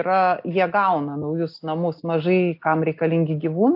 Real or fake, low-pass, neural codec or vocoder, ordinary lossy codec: real; 5.4 kHz; none; AAC, 48 kbps